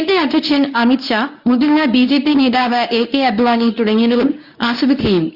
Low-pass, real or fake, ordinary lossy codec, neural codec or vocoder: 5.4 kHz; fake; Opus, 64 kbps; codec, 24 kHz, 0.9 kbps, WavTokenizer, medium speech release version 1